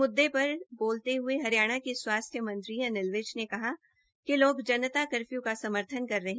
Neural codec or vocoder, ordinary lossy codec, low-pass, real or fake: none; none; none; real